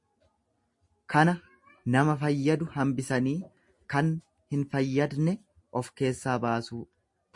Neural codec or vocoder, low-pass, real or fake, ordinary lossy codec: none; 10.8 kHz; real; MP3, 48 kbps